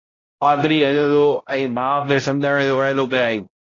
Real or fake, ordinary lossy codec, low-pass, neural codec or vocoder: fake; AAC, 32 kbps; 7.2 kHz; codec, 16 kHz, 0.5 kbps, X-Codec, HuBERT features, trained on balanced general audio